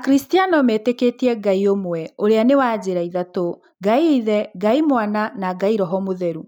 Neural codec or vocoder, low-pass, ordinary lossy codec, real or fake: none; 19.8 kHz; none; real